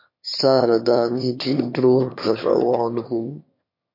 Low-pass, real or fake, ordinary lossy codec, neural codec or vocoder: 5.4 kHz; fake; MP3, 32 kbps; autoencoder, 22.05 kHz, a latent of 192 numbers a frame, VITS, trained on one speaker